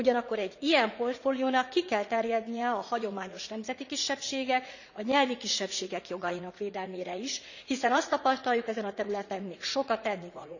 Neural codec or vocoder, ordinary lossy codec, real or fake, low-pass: vocoder, 44.1 kHz, 80 mel bands, Vocos; none; fake; 7.2 kHz